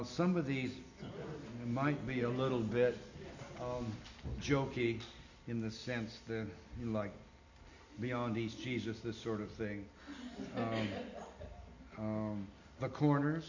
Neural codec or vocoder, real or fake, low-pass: none; real; 7.2 kHz